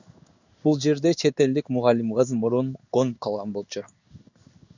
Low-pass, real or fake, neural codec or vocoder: 7.2 kHz; fake; codec, 16 kHz in and 24 kHz out, 1 kbps, XY-Tokenizer